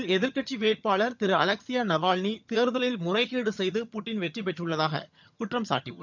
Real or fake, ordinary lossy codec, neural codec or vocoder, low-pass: fake; none; vocoder, 22.05 kHz, 80 mel bands, HiFi-GAN; 7.2 kHz